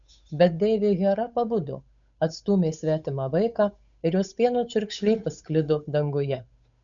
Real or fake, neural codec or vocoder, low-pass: fake; codec, 16 kHz, 8 kbps, FunCodec, trained on Chinese and English, 25 frames a second; 7.2 kHz